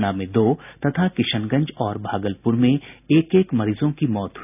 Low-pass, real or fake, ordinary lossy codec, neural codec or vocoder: 3.6 kHz; real; none; none